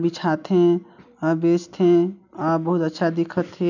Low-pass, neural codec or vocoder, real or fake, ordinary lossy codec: 7.2 kHz; none; real; none